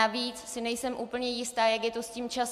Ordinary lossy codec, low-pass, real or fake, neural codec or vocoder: AAC, 96 kbps; 14.4 kHz; real; none